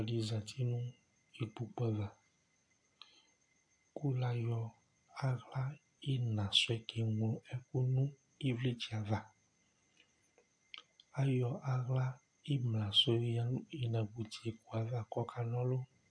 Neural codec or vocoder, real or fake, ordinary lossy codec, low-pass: none; real; AAC, 64 kbps; 9.9 kHz